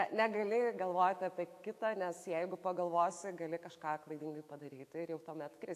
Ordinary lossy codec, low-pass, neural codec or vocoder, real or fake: AAC, 64 kbps; 14.4 kHz; autoencoder, 48 kHz, 128 numbers a frame, DAC-VAE, trained on Japanese speech; fake